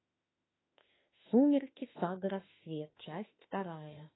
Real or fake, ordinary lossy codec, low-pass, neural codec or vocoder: fake; AAC, 16 kbps; 7.2 kHz; autoencoder, 48 kHz, 32 numbers a frame, DAC-VAE, trained on Japanese speech